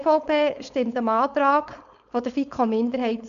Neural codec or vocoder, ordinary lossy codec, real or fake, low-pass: codec, 16 kHz, 4.8 kbps, FACodec; none; fake; 7.2 kHz